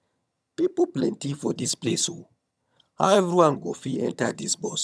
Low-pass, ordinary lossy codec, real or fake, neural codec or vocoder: none; none; fake; vocoder, 22.05 kHz, 80 mel bands, HiFi-GAN